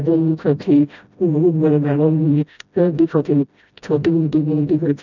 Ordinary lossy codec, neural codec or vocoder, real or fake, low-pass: none; codec, 16 kHz, 0.5 kbps, FreqCodec, smaller model; fake; 7.2 kHz